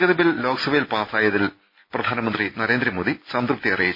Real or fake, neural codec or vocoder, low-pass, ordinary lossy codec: real; none; 5.4 kHz; MP3, 24 kbps